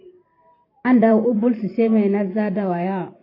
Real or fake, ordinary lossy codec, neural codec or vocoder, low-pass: fake; AAC, 24 kbps; vocoder, 44.1 kHz, 128 mel bands every 512 samples, BigVGAN v2; 5.4 kHz